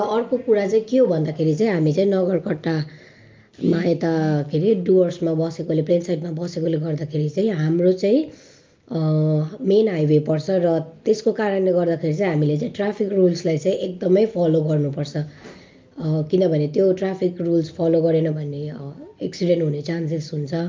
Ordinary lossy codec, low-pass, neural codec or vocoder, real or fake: Opus, 24 kbps; 7.2 kHz; none; real